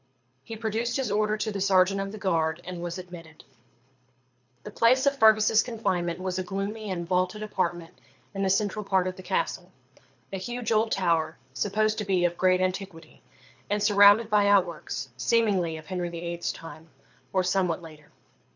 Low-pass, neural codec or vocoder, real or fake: 7.2 kHz; codec, 24 kHz, 6 kbps, HILCodec; fake